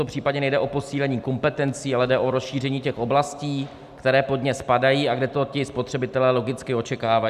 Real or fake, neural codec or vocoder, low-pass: real; none; 14.4 kHz